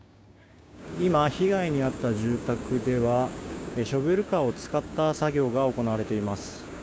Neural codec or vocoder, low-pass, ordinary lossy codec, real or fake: codec, 16 kHz, 6 kbps, DAC; none; none; fake